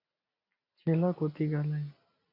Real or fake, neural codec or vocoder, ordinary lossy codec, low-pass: real; none; AAC, 24 kbps; 5.4 kHz